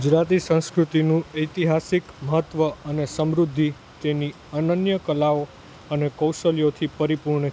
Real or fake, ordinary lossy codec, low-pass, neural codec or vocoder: real; none; none; none